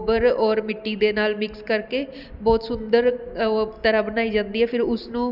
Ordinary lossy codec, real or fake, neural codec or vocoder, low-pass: none; real; none; 5.4 kHz